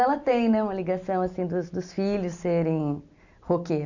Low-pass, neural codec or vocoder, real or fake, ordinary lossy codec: 7.2 kHz; none; real; none